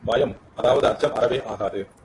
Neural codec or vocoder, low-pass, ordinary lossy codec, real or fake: vocoder, 44.1 kHz, 128 mel bands every 512 samples, BigVGAN v2; 10.8 kHz; AAC, 32 kbps; fake